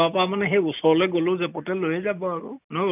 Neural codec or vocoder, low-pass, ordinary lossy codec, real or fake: none; 3.6 kHz; none; real